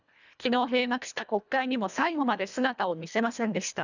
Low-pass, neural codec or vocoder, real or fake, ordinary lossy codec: 7.2 kHz; codec, 24 kHz, 1.5 kbps, HILCodec; fake; none